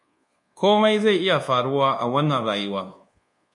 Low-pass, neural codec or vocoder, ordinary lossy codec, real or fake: 10.8 kHz; codec, 24 kHz, 1.2 kbps, DualCodec; MP3, 48 kbps; fake